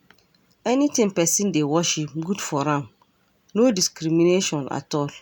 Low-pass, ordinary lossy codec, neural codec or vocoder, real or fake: none; none; none; real